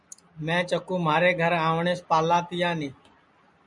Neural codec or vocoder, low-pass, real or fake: none; 10.8 kHz; real